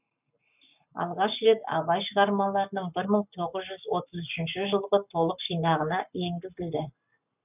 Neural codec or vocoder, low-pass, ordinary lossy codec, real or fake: codec, 44.1 kHz, 7.8 kbps, Pupu-Codec; 3.6 kHz; none; fake